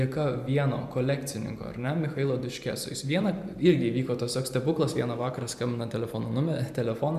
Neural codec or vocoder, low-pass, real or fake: vocoder, 44.1 kHz, 128 mel bands every 512 samples, BigVGAN v2; 14.4 kHz; fake